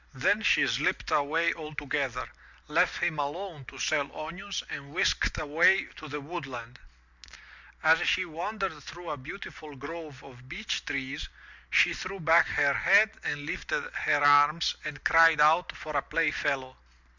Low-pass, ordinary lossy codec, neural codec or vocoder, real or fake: 7.2 kHz; Opus, 64 kbps; none; real